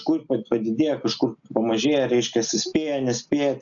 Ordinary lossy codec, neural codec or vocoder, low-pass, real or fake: MP3, 96 kbps; none; 7.2 kHz; real